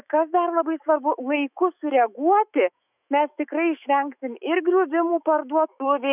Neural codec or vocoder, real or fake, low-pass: autoencoder, 48 kHz, 128 numbers a frame, DAC-VAE, trained on Japanese speech; fake; 3.6 kHz